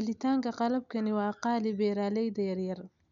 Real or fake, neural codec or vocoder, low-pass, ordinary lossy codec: real; none; 7.2 kHz; none